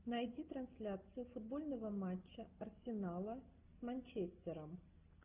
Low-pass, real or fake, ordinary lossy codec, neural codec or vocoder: 3.6 kHz; real; Opus, 24 kbps; none